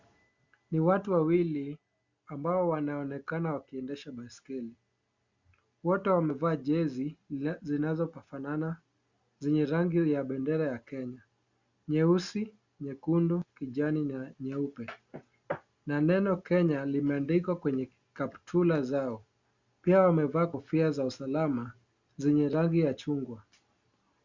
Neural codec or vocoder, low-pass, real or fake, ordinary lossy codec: none; 7.2 kHz; real; Opus, 64 kbps